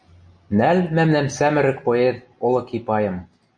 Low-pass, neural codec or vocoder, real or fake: 9.9 kHz; none; real